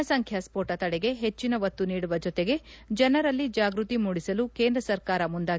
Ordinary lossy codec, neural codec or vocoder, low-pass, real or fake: none; none; none; real